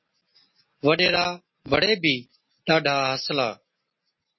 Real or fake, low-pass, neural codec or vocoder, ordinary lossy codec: real; 7.2 kHz; none; MP3, 24 kbps